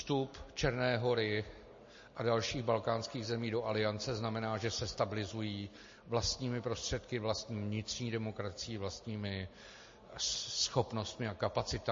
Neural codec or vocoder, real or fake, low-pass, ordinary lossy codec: none; real; 7.2 kHz; MP3, 32 kbps